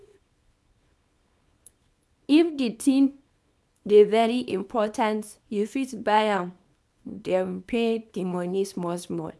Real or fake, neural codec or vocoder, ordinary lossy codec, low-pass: fake; codec, 24 kHz, 0.9 kbps, WavTokenizer, small release; none; none